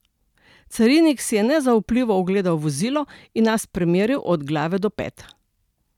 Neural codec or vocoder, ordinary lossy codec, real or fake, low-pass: none; none; real; 19.8 kHz